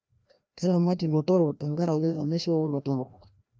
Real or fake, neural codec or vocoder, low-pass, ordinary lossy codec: fake; codec, 16 kHz, 1 kbps, FreqCodec, larger model; none; none